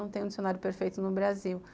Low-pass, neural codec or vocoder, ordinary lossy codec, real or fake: none; none; none; real